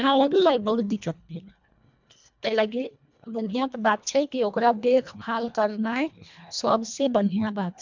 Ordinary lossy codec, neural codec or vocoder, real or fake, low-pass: MP3, 64 kbps; codec, 24 kHz, 1.5 kbps, HILCodec; fake; 7.2 kHz